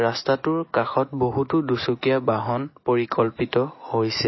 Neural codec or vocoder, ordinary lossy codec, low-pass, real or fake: none; MP3, 24 kbps; 7.2 kHz; real